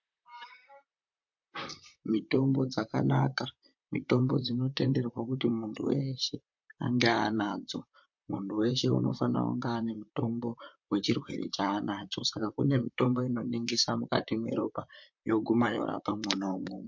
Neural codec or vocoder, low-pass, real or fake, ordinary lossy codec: vocoder, 44.1 kHz, 128 mel bands, Pupu-Vocoder; 7.2 kHz; fake; MP3, 48 kbps